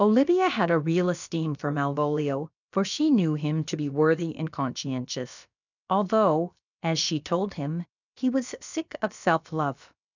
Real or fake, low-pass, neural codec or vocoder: fake; 7.2 kHz; codec, 16 kHz, about 1 kbps, DyCAST, with the encoder's durations